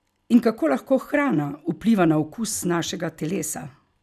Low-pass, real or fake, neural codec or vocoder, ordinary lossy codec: 14.4 kHz; real; none; none